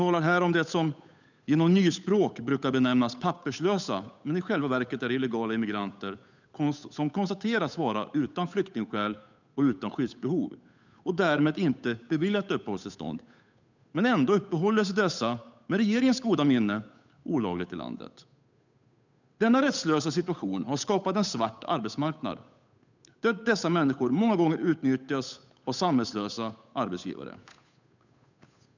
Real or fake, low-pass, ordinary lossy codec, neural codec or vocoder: fake; 7.2 kHz; none; codec, 16 kHz, 8 kbps, FunCodec, trained on Chinese and English, 25 frames a second